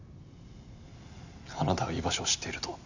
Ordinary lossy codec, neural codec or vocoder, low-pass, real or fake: none; none; 7.2 kHz; real